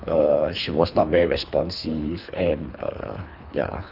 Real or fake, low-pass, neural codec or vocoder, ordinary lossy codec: fake; 5.4 kHz; codec, 24 kHz, 3 kbps, HILCodec; none